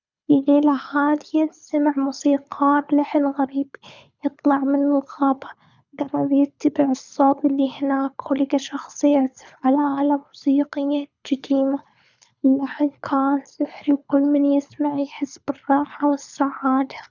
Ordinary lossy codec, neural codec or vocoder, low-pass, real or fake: none; codec, 24 kHz, 6 kbps, HILCodec; 7.2 kHz; fake